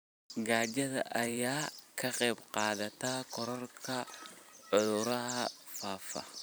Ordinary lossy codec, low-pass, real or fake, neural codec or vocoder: none; none; fake; vocoder, 44.1 kHz, 128 mel bands every 512 samples, BigVGAN v2